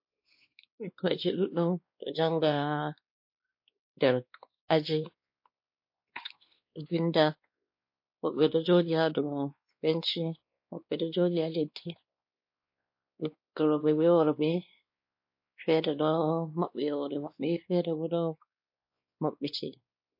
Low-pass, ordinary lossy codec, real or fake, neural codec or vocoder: 5.4 kHz; MP3, 32 kbps; fake; codec, 16 kHz, 2 kbps, X-Codec, WavLM features, trained on Multilingual LibriSpeech